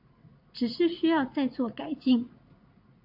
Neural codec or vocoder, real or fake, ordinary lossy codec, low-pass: vocoder, 44.1 kHz, 80 mel bands, Vocos; fake; AAC, 48 kbps; 5.4 kHz